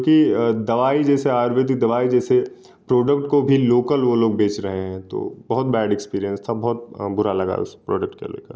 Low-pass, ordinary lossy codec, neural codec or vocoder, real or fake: none; none; none; real